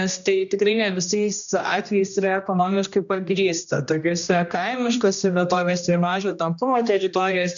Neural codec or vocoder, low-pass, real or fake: codec, 16 kHz, 1 kbps, X-Codec, HuBERT features, trained on general audio; 7.2 kHz; fake